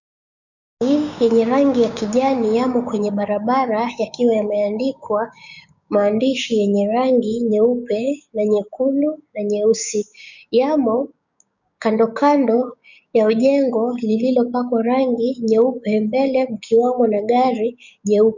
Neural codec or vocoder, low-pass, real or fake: codec, 16 kHz, 6 kbps, DAC; 7.2 kHz; fake